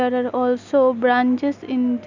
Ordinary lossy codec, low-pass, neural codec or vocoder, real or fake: none; 7.2 kHz; none; real